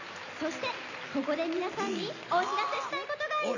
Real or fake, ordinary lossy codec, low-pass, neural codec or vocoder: real; none; 7.2 kHz; none